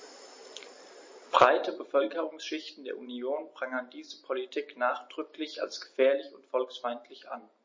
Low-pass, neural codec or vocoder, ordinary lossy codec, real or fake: 7.2 kHz; none; MP3, 32 kbps; real